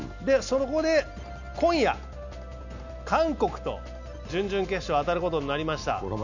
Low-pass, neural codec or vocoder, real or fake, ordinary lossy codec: 7.2 kHz; none; real; none